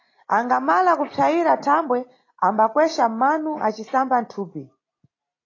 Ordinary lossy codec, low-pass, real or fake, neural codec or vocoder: AAC, 32 kbps; 7.2 kHz; real; none